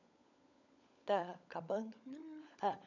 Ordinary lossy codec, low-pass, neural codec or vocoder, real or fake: none; 7.2 kHz; codec, 16 kHz, 16 kbps, FunCodec, trained on LibriTTS, 50 frames a second; fake